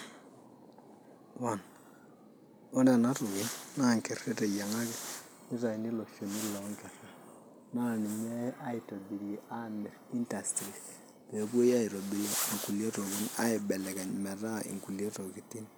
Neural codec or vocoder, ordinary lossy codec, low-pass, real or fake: none; none; none; real